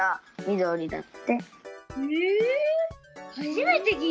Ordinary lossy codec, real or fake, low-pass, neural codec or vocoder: none; real; none; none